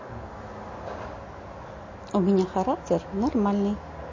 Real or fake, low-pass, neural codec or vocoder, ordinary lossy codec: real; 7.2 kHz; none; MP3, 32 kbps